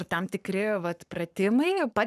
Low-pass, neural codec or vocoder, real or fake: 14.4 kHz; codec, 44.1 kHz, 7.8 kbps, Pupu-Codec; fake